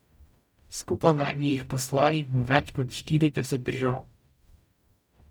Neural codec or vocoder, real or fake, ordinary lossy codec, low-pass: codec, 44.1 kHz, 0.9 kbps, DAC; fake; none; none